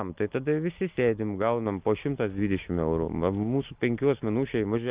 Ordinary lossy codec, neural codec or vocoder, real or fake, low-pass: Opus, 32 kbps; none; real; 3.6 kHz